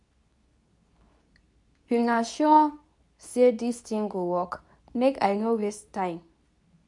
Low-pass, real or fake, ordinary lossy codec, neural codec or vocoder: 10.8 kHz; fake; none; codec, 24 kHz, 0.9 kbps, WavTokenizer, medium speech release version 2